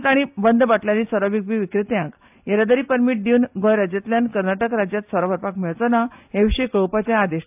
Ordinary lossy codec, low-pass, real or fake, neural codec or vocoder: AAC, 32 kbps; 3.6 kHz; real; none